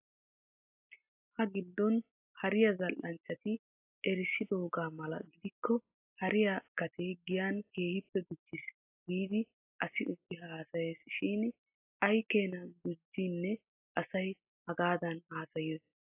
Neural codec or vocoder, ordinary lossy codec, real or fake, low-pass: none; AAC, 24 kbps; real; 3.6 kHz